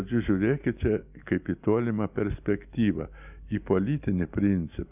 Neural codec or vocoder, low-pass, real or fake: none; 3.6 kHz; real